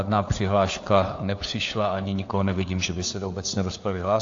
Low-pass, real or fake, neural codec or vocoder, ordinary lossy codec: 7.2 kHz; fake; codec, 16 kHz, 4 kbps, FunCodec, trained on LibriTTS, 50 frames a second; AAC, 48 kbps